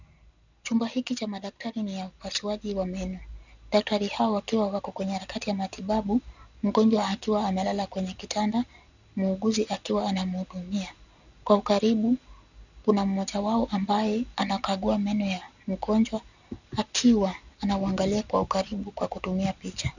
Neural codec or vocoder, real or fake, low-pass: none; real; 7.2 kHz